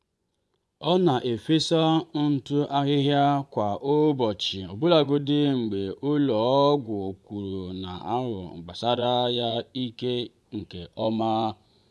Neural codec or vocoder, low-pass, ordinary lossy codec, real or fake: vocoder, 24 kHz, 100 mel bands, Vocos; none; none; fake